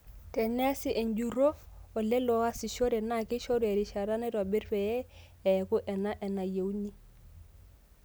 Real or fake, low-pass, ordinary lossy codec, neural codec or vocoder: real; none; none; none